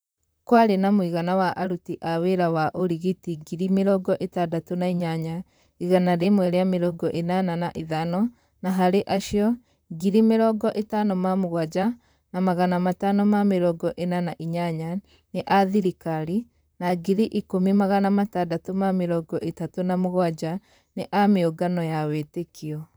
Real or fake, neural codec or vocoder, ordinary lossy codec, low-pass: fake; vocoder, 44.1 kHz, 128 mel bands, Pupu-Vocoder; none; none